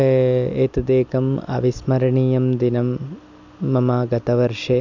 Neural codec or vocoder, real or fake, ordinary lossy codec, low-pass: none; real; none; 7.2 kHz